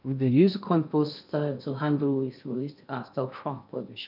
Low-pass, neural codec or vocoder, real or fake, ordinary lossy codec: 5.4 kHz; codec, 16 kHz in and 24 kHz out, 0.6 kbps, FocalCodec, streaming, 2048 codes; fake; none